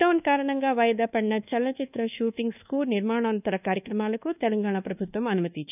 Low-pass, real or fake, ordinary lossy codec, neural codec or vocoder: 3.6 kHz; fake; none; codec, 24 kHz, 1.2 kbps, DualCodec